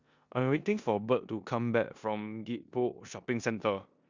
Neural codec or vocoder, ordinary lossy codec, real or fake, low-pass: codec, 16 kHz in and 24 kHz out, 0.9 kbps, LongCat-Audio-Codec, four codebook decoder; Opus, 64 kbps; fake; 7.2 kHz